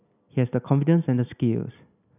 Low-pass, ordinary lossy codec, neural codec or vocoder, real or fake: 3.6 kHz; none; none; real